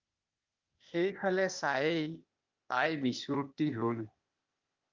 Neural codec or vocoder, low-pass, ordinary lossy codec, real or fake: codec, 16 kHz, 0.8 kbps, ZipCodec; 7.2 kHz; Opus, 32 kbps; fake